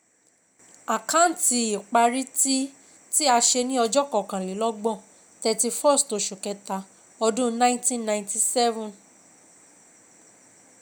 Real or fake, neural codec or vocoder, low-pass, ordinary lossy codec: real; none; none; none